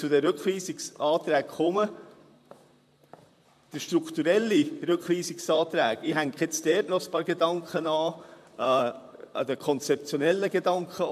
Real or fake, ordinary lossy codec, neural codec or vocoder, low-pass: fake; MP3, 96 kbps; vocoder, 44.1 kHz, 128 mel bands, Pupu-Vocoder; 14.4 kHz